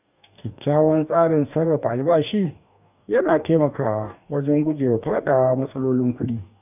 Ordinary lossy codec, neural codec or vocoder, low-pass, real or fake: none; codec, 44.1 kHz, 2.6 kbps, DAC; 3.6 kHz; fake